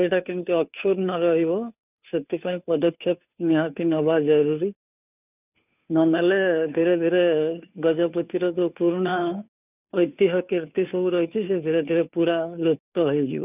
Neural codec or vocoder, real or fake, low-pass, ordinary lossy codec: codec, 16 kHz, 2 kbps, FunCodec, trained on Chinese and English, 25 frames a second; fake; 3.6 kHz; none